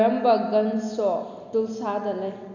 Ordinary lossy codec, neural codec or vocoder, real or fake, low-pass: MP3, 64 kbps; none; real; 7.2 kHz